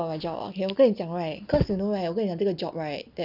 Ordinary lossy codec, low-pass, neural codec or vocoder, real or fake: none; 5.4 kHz; none; real